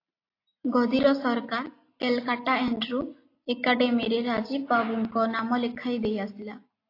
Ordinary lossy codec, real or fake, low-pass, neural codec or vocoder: AAC, 32 kbps; real; 5.4 kHz; none